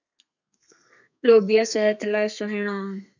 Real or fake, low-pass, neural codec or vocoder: fake; 7.2 kHz; codec, 32 kHz, 1.9 kbps, SNAC